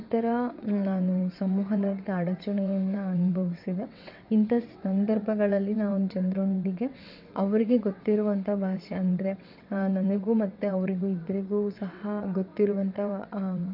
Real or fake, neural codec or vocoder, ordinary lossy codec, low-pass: fake; vocoder, 22.05 kHz, 80 mel bands, WaveNeXt; none; 5.4 kHz